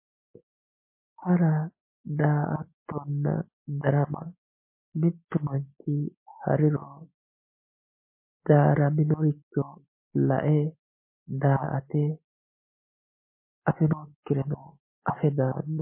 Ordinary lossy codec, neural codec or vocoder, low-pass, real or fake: MP3, 24 kbps; none; 3.6 kHz; real